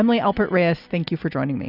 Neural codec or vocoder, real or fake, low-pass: none; real; 5.4 kHz